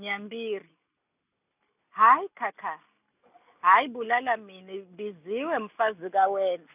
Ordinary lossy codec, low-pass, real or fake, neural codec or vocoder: none; 3.6 kHz; real; none